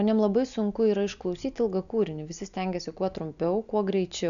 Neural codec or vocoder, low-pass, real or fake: none; 7.2 kHz; real